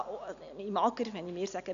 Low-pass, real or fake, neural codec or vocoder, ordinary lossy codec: 7.2 kHz; real; none; none